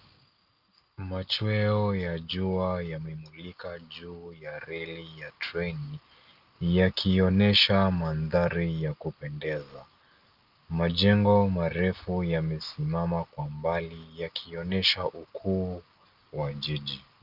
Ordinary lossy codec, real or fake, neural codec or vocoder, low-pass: Opus, 24 kbps; real; none; 5.4 kHz